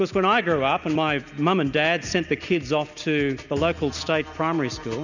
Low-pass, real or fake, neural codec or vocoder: 7.2 kHz; real; none